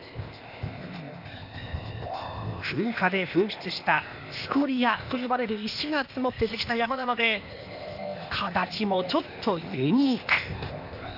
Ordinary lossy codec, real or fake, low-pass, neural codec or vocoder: none; fake; 5.4 kHz; codec, 16 kHz, 0.8 kbps, ZipCodec